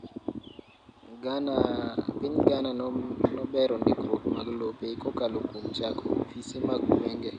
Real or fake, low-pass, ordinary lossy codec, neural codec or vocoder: real; 9.9 kHz; none; none